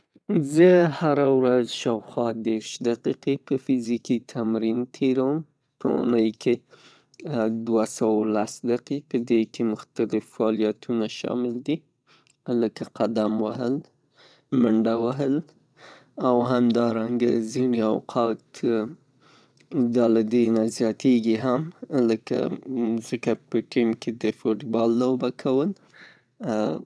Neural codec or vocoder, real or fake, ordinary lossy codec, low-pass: vocoder, 22.05 kHz, 80 mel bands, WaveNeXt; fake; none; none